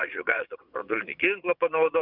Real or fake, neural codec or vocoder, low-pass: fake; vocoder, 22.05 kHz, 80 mel bands, Vocos; 5.4 kHz